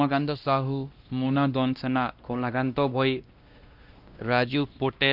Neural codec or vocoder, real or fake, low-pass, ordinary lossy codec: codec, 16 kHz, 1 kbps, X-Codec, WavLM features, trained on Multilingual LibriSpeech; fake; 5.4 kHz; Opus, 24 kbps